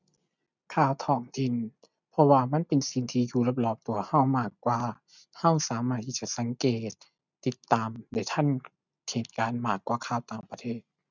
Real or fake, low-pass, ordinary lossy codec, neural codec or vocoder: real; 7.2 kHz; none; none